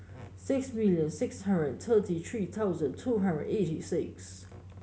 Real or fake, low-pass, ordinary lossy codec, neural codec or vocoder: real; none; none; none